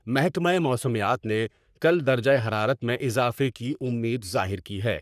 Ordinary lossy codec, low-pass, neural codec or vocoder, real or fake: none; 14.4 kHz; codec, 44.1 kHz, 3.4 kbps, Pupu-Codec; fake